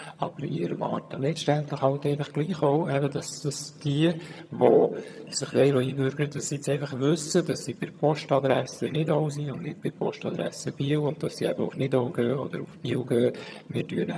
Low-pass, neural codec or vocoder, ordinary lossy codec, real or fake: none; vocoder, 22.05 kHz, 80 mel bands, HiFi-GAN; none; fake